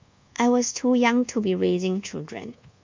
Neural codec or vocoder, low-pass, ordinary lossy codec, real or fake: codec, 24 kHz, 1.2 kbps, DualCodec; 7.2 kHz; MP3, 64 kbps; fake